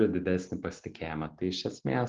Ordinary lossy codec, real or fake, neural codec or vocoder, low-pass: Opus, 16 kbps; real; none; 7.2 kHz